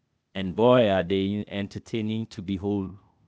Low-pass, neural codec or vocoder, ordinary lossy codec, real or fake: none; codec, 16 kHz, 0.8 kbps, ZipCodec; none; fake